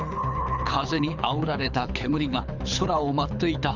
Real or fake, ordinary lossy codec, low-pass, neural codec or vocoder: fake; none; 7.2 kHz; codec, 24 kHz, 6 kbps, HILCodec